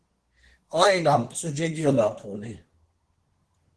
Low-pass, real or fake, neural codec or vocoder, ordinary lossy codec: 10.8 kHz; fake; codec, 24 kHz, 1 kbps, SNAC; Opus, 16 kbps